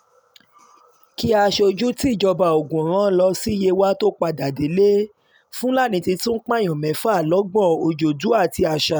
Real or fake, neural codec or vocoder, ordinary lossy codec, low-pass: real; none; none; none